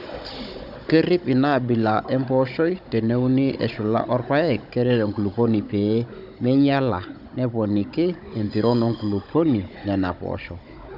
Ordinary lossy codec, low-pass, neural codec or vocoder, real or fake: none; 5.4 kHz; codec, 16 kHz, 16 kbps, FunCodec, trained on Chinese and English, 50 frames a second; fake